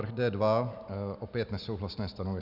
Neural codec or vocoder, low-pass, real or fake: none; 5.4 kHz; real